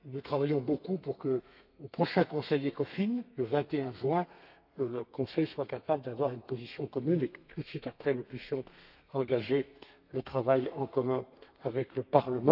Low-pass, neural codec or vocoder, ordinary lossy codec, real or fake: 5.4 kHz; codec, 32 kHz, 1.9 kbps, SNAC; none; fake